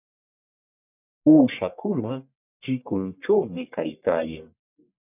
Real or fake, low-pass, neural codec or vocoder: fake; 3.6 kHz; codec, 44.1 kHz, 1.7 kbps, Pupu-Codec